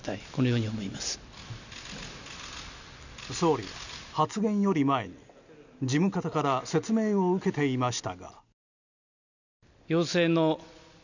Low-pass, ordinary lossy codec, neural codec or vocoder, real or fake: 7.2 kHz; none; none; real